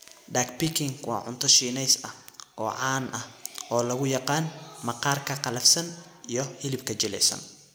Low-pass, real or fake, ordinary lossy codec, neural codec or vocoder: none; real; none; none